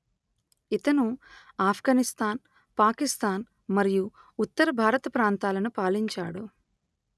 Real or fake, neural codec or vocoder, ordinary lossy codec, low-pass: real; none; none; none